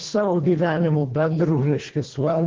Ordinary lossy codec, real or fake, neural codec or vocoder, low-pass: Opus, 16 kbps; fake; codec, 24 kHz, 1.5 kbps, HILCodec; 7.2 kHz